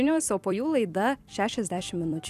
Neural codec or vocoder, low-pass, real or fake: vocoder, 44.1 kHz, 128 mel bands every 256 samples, BigVGAN v2; 14.4 kHz; fake